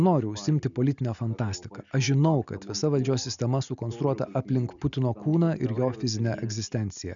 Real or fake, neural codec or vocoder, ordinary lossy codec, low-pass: real; none; MP3, 96 kbps; 7.2 kHz